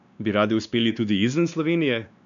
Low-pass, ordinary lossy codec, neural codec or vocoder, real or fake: 7.2 kHz; none; codec, 16 kHz, 2 kbps, X-Codec, WavLM features, trained on Multilingual LibriSpeech; fake